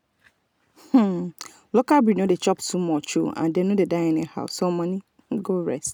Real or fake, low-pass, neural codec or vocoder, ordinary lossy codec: real; none; none; none